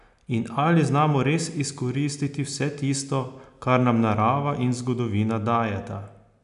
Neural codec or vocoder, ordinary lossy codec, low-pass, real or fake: none; none; 10.8 kHz; real